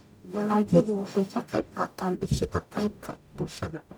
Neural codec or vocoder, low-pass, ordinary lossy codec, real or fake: codec, 44.1 kHz, 0.9 kbps, DAC; none; none; fake